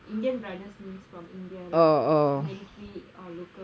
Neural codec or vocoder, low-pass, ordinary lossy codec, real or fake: none; none; none; real